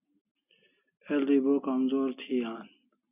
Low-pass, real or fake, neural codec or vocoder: 3.6 kHz; real; none